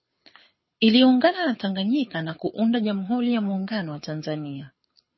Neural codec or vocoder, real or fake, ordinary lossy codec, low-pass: codec, 24 kHz, 6 kbps, HILCodec; fake; MP3, 24 kbps; 7.2 kHz